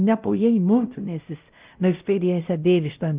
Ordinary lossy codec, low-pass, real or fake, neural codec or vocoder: Opus, 32 kbps; 3.6 kHz; fake; codec, 16 kHz, 0.5 kbps, X-Codec, WavLM features, trained on Multilingual LibriSpeech